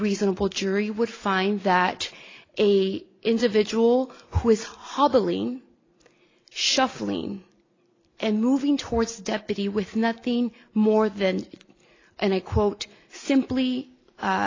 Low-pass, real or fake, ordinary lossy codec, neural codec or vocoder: 7.2 kHz; real; AAC, 32 kbps; none